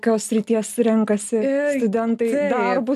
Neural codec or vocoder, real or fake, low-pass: none; real; 14.4 kHz